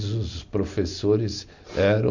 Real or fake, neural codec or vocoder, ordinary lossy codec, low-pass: real; none; none; 7.2 kHz